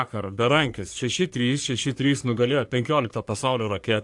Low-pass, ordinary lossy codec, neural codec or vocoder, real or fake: 10.8 kHz; AAC, 64 kbps; codec, 44.1 kHz, 3.4 kbps, Pupu-Codec; fake